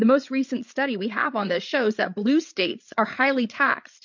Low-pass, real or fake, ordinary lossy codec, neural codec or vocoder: 7.2 kHz; fake; MP3, 48 kbps; codec, 16 kHz, 8 kbps, FreqCodec, larger model